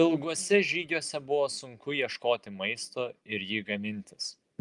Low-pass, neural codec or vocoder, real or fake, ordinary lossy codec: 10.8 kHz; none; real; Opus, 32 kbps